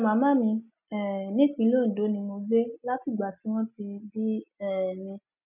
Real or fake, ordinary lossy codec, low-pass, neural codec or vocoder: real; none; 3.6 kHz; none